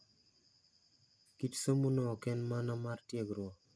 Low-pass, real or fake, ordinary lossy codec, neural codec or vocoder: none; real; none; none